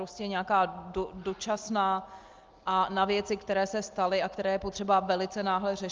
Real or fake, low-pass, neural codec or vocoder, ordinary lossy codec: real; 7.2 kHz; none; Opus, 32 kbps